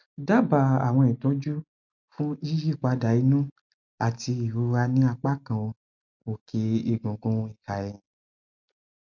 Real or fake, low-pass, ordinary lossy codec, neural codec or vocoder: real; 7.2 kHz; MP3, 64 kbps; none